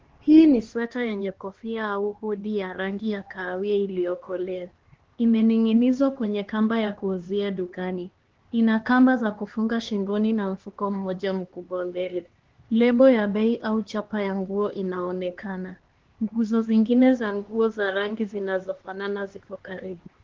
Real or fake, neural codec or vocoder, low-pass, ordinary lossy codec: fake; codec, 16 kHz, 2 kbps, X-Codec, HuBERT features, trained on LibriSpeech; 7.2 kHz; Opus, 16 kbps